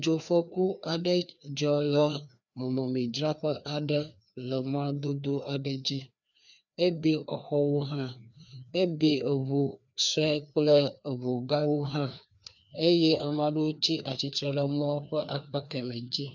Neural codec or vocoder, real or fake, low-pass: codec, 16 kHz, 2 kbps, FreqCodec, larger model; fake; 7.2 kHz